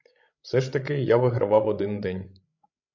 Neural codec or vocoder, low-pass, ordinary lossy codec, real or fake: codec, 16 kHz, 16 kbps, FreqCodec, larger model; 7.2 kHz; MP3, 48 kbps; fake